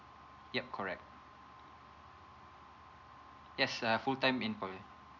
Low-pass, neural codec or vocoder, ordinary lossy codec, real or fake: 7.2 kHz; none; none; real